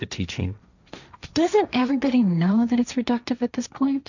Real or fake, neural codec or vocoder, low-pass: fake; codec, 16 kHz, 1.1 kbps, Voila-Tokenizer; 7.2 kHz